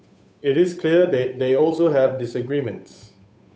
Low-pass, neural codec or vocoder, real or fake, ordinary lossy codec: none; codec, 16 kHz, 8 kbps, FunCodec, trained on Chinese and English, 25 frames a second; fake; none